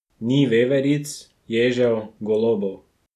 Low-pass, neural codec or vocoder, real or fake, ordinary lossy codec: 14.4 kHz; none; real; none